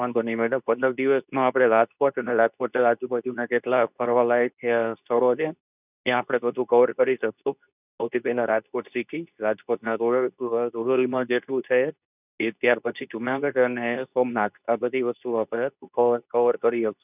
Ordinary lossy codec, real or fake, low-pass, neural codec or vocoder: none; fake; 3.6 kHz; codec, 24 kHz, 0.9 kbps, WavTokenizer, medium speech release version 2